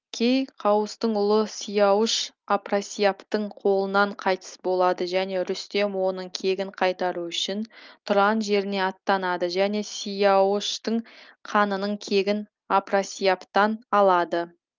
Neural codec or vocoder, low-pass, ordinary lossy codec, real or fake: none; 7.2 kHz; Opus, 24 kbps; real